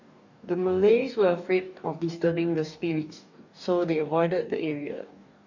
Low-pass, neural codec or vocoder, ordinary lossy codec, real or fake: 7.2 kHz; codec, 44.1 kHz, 2.6 kbps, DAC; none; fake